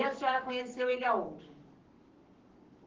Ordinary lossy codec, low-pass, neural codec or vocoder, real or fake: Opus, 16 kbps; 7.2 kHz; codec, 44.1 kHz, 7.8 kbps, Pupu-Codec; fake